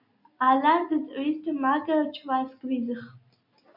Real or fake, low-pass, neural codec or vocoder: real; 5.4 kHz; none